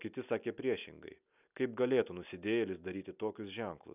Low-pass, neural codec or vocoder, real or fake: 3.6 kHz; none; real